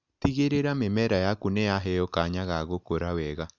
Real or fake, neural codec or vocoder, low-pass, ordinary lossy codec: real; none; 7.2 kHz; none